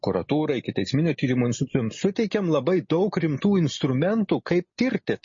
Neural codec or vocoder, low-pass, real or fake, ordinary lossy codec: none; 7.2 kHz; real; MP3, 32 kbps